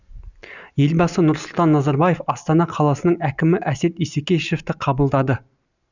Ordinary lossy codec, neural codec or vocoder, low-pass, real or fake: none; none; 7.2 kHz; real